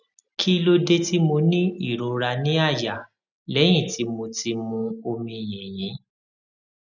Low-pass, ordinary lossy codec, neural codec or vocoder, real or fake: 7.2 kHz; none; none; real